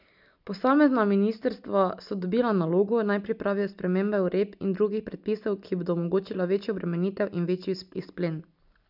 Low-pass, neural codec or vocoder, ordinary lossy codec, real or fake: 5.4 kHz; none; none; real